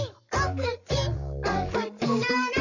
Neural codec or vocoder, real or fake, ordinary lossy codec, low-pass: codec, 44.1 kHz, 7.8 kbps, Pupu-Codec; fake; none; 7.2 kHz